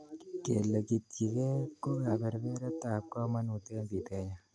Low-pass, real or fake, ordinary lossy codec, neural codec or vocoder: none; real; none; none